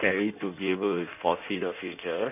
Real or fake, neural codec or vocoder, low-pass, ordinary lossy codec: fake; codec, 16 kHz in and 24 kHz out, 1.1 kbps, FireRedTTS-2 codec; 3.6 kHz; none